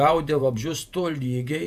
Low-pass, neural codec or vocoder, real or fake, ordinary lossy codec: 14.4 kHz; vocoder, 48 kHz, 128 mel bands, Vocos; fake; AAC, 96 kbps